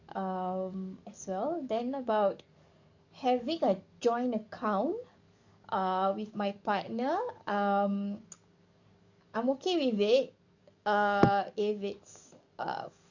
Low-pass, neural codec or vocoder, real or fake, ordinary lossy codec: 7.2 kHz; codec, 44.1 kHz, 7.8 kbps, DAC; fake; none